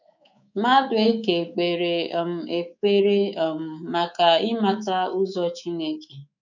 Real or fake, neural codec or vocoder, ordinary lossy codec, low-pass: fake; codec, 24 kHz, 3.1 kbps, DualCodec; none; 7.2 kHz